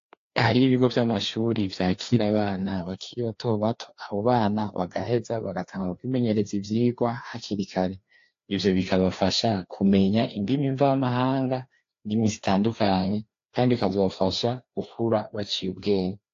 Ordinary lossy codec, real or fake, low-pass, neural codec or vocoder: AAC, 48 kbps; fake; 7.2 kHz; codec, 16 kHz, 2 kbps, FreqCodec, larger model